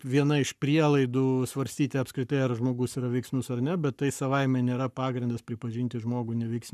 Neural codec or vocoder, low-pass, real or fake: codec, 44.1 kHz, 7.8 kbps, Pupu-Codec; 14.4 kHz; fake